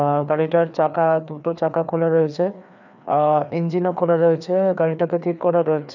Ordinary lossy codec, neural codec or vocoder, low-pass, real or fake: none; codec, 16 kHz, 2 kbps, FreqCodec, larger model; 7.2 kHz; fake